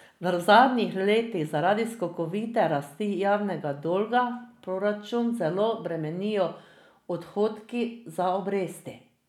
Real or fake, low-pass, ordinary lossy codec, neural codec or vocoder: real; 19.8 kHz; none; none